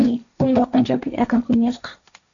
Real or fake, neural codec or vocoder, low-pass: fake; codec, 16 kHz, 1.1 kbps, Voila-Tokenizer; 7.2 kHz